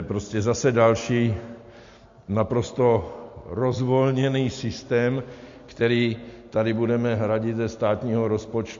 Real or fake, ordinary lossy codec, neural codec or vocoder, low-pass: real; MP3, 48 kbps; none; 7.2 kHz